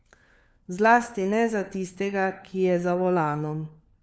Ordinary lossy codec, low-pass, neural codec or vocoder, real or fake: none; none; codec, 16 kHz, 4 kbps, FunCodec, trained on LibriTTS, 50 frames a second; fake